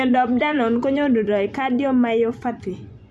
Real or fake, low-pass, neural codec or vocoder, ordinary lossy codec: fake; none; vocoder, 24 kHz, 100 mel bands, Vocos; none